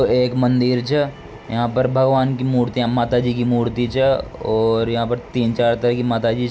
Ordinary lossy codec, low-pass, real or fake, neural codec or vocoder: none; none; real; none